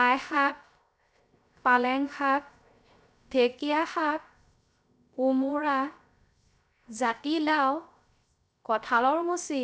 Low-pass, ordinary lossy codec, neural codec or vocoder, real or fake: none; none; codec, 16 kHz, 0.3 kbps, FocalCodec; fake